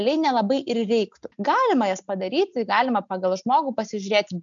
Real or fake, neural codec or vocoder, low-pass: real; none; 7.2 kHz